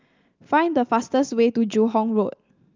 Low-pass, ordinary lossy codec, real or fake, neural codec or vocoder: 7.2 kHz; Opus, 24 kbps; real; none